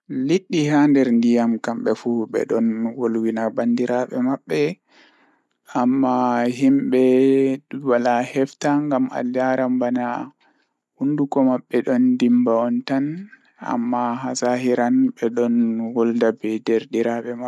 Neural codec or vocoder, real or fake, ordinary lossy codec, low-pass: none; real; none; none